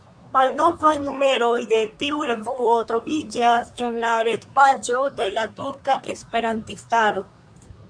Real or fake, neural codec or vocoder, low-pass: fake; codec, 24 kHz, 1 kbps, SNAC; 9.9 kHz